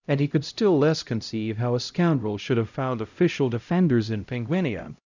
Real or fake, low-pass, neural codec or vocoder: fake; 7.2 kHz; codec, 16 kHz, 0.5 kbps, X-Codec, HuBERT features, trained on LibriSpeech